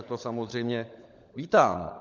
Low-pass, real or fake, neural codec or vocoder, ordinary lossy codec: 7.2 kHz; fake; codec, 16 kHz, 16 kbps, FunCodec, trained on LibriTTS, 50 frames a second; MP3, 64 kbps